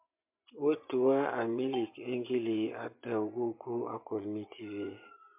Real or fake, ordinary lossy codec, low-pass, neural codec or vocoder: fake; MP3, 32 kbps; 3.6 kHz; vocoder, 24 kHz, 100 mel bands, Vocos